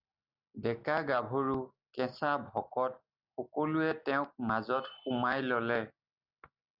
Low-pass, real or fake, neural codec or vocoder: 5.4 kHz; real; none